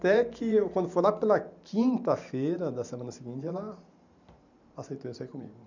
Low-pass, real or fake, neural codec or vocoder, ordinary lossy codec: 7.2 kHz; fake; vocoder, 44.1 kHz, 128 mel bands every 512 samples, BigVGAN v2; none